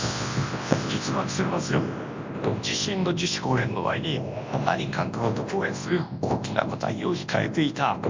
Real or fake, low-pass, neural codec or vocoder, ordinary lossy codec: fake; 7.2 kHz; codec, 24 kHz, 0.9 kbps, WavTokenizer, large speech release; MP3, 48 kbps